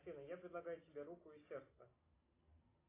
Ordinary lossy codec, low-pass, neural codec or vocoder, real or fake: AAC, 24 kbps; 3.6 kHz; none; real